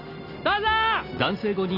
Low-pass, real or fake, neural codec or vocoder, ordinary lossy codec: 5.4 kHz; real; none; none